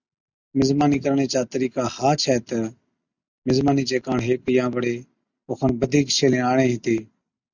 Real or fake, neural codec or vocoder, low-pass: real; none; 7.2 kHz